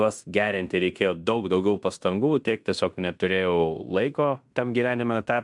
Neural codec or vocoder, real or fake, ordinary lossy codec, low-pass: codec, 16 kHz in and 24 kHz out, 0.9 kbps, LongCat-Audio-Codec, fine tuned four codebook decoder; fake; MP3, 96 kbps; 10.8 kHz